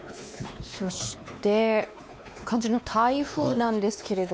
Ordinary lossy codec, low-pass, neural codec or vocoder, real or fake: none; none; codec, 16 kHz, 2 kbps, X-Codec, WavLM features, trained on Multilingual LibriSpeech; fake